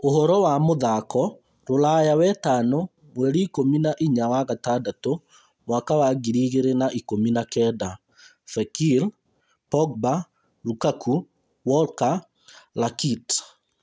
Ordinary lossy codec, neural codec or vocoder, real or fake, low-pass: none; none; real; none